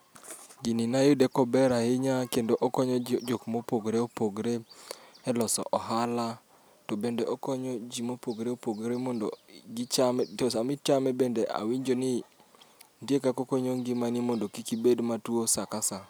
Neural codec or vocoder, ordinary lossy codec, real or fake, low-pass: none; none; real; none